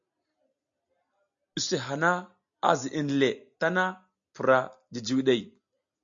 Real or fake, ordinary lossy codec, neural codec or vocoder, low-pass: real; AAC, 64 kbps; none; 7.2 kHz